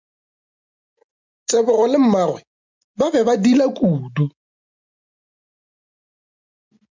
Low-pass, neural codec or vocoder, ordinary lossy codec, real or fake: 7.2 kHz; none; MP3, 64 kbps; real